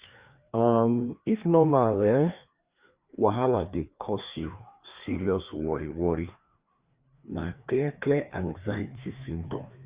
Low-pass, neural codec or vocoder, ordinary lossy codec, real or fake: 3.6 kHz; codec, 16 kHz, 2 kbps, FreqCodec, larger model; Opus, 64 kbps; fake